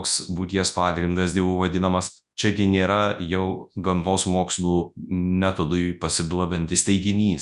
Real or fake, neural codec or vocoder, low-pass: fake; codec, 24 kHz, 0.9 kbps, WavTokenizer, large speech release; 10.8 kHz